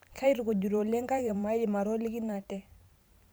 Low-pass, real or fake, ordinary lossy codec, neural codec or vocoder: none; fake; none; vocoder, 44.1 kHz, 128 mel bands every 512 samples, BigVGAN v2